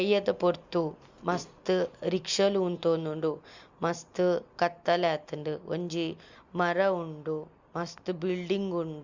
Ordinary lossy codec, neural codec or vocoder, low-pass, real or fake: Opus, 64 kbps; none; 7.2 kHz; real